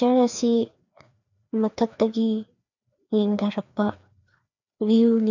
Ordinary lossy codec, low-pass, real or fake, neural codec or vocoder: none; 7.2 kHz; fake; codec, 16 kHz, 2 kbps, FreqCodec, larger model